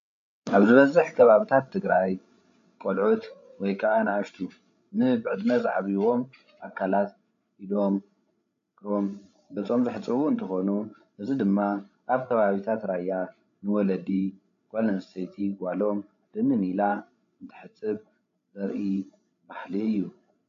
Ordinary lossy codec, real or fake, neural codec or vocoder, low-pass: MP3, 64 kbps; fake; codec, 16 kHz, 16 kbps, FreqCodec, larger model; 7.2 kHz